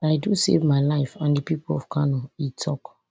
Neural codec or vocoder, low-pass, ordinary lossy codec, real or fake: none; none; none; real